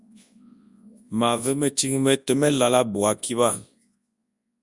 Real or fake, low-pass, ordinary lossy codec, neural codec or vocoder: fake; 10.8 kHz; Opus, 64 kbps; codec, 24 kHz, 0.9 kbps, WavTokenizer, large speech release